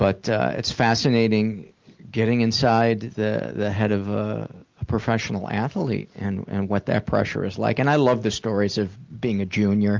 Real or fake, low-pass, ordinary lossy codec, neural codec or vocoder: real; 7.2 kHz; Opus, 24 kbps; none